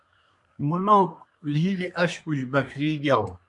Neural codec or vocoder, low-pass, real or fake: codec, 24 kHz, 1 kbps, SNAC; 10.8 kHz; fake